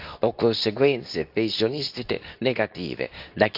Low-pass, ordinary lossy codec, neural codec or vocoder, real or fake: 5.4 kHz; none; codec, 24 kHz, 0.9 kbps, WavTokenizer, medium speech release version 2; fake